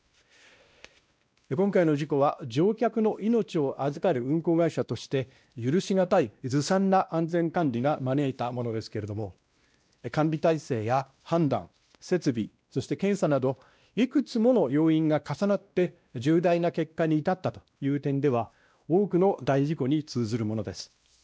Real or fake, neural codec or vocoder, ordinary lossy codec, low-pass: fake; codec, 16 kHz, 1 kbps, X-Codec, WavLM features, trained on Multilingual LibriSpeech; none; none